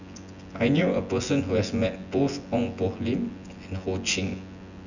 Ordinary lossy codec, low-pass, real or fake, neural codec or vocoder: none; 7.2 kHz; fake; vocoder, 24 kHz, 100 mel bands, Vocos